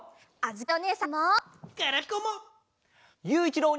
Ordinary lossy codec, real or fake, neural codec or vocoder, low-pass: none; real; none; none